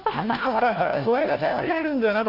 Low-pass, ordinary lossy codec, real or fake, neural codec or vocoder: 5.4 kHz; Opus, 64 kbps; fake; codec, 16 kHz, 1 kbps, FunCodec, trained on LibriTTS, 50 frames a second